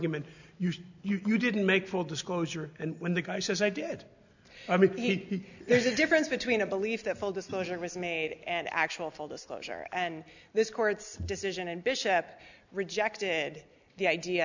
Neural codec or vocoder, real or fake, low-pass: none; real; 7.2 kHz